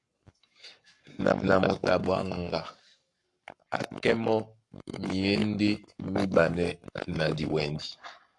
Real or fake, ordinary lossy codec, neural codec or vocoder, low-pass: fake; AAC, 64 kbps; codec, 44.1 kHz, 7.8 kbps, Pupu-Codec; 10.8 kHz